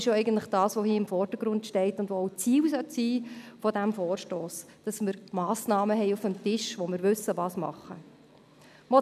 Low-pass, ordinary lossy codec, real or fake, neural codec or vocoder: 14.4 kHz; none; real; none